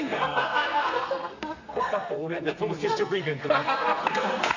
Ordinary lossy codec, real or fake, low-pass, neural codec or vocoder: none; fake; 7.2 kHz; codec, 32 kHz, 1.9 kbps, SNAC